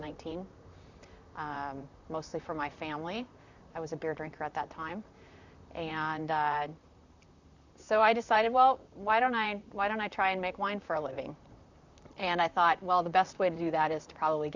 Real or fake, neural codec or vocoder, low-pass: fake; vocoder, 44.1 kHz, 128 mel bands, Pupu-Vocoder; 7.2 kHz